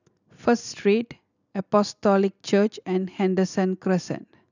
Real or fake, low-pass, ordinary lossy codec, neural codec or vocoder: real; 7.2 kHz; none; none